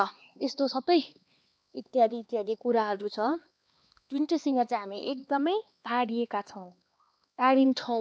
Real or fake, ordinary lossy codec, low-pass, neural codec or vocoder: fake; none; none; codec, 16 kHz, 2 kbps, X-Codec, HuBERT features, trained on LibriSpeech